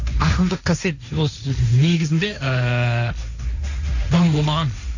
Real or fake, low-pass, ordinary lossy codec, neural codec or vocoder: fake; 7.2 kHz; none; codec, 16 kHz, 1.1 kbps, Voila-Tokenizer